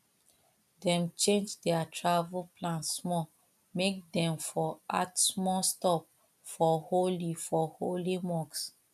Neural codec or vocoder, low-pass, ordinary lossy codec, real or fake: none; 14.4 kHz; none; real